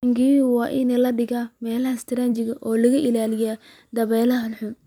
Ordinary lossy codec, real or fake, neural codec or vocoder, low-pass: none; fake; vocoder, 44.1 kHz, 128 mel bands every 256 samples, BigVGAN v2; 19.8 kHz